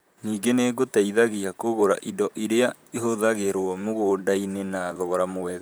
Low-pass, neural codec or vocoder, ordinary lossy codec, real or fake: none; vocoder, 44.1 kHz, 128 mel bands, Pupu-Vocoder; none; fake